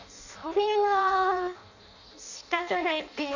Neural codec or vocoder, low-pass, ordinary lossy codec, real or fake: codec, 16 kHz in and 24 kHz out, 0.6 kbps, FireRedTTS-2 codec; 7.2 kHz; none; fake